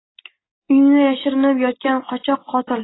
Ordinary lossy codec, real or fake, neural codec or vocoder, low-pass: AAC, 16 kbps; real; none; 7.2 kHz